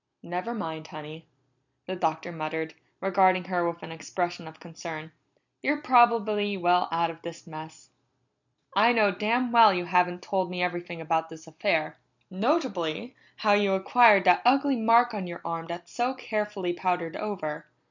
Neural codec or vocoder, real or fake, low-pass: none; real; 7.2 kHz